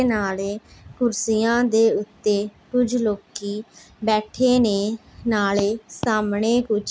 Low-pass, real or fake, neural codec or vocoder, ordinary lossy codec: none; real; none; none